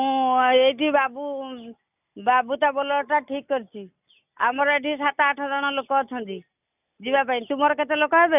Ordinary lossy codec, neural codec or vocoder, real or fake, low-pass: none; none; real; 3.6 kHz